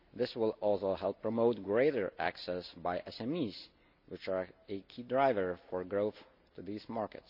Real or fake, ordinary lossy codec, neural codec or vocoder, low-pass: real; none; none; 5.4 kHz